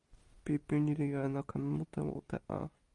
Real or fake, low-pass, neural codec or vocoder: real; 10.8 kHz; none